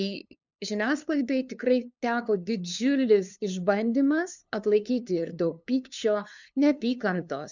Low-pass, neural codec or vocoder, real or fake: 7.2 kHz; codec, 16 kHz, 2 kbps, FunCodec, trained on LibriTTS, 25 frames a second; fake